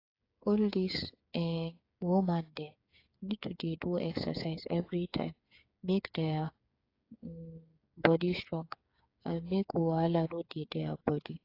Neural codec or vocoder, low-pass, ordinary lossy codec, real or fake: codec, 16 kHz, 8 kbps, FreqCodec, smaller model; 5.4 kHz; AAC, 32 kbps; fake